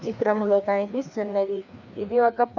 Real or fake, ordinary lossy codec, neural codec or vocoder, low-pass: fake; none; codec, 16 kHz, 2 kbps, FreqCodec, larger model; 7.2 kHz